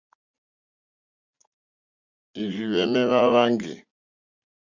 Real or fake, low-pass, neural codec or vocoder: fake; 7.2 kHz; vocoder, 44.1 kHz, 80 mel bands, Vocos